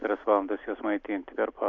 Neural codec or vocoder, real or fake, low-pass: none; real; 7.2 kHz